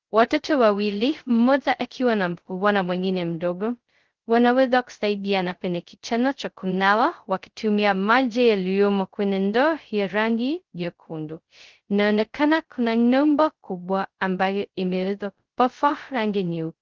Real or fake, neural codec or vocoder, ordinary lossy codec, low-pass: fake; codec, 16 kHz, 0.2 kbps, FocalCodec; Opus, 16 kbps; 7.2 kHz